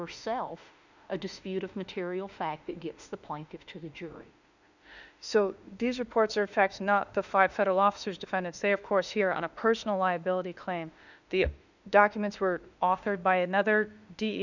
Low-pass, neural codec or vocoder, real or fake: 7.2 kHz; autoencoder, 48 kHz, 32 numbers a frame, DAC-VAE, trained on Japanese speech; fake